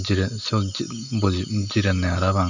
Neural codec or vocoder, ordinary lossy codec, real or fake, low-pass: none; none; real; 7.2 kHz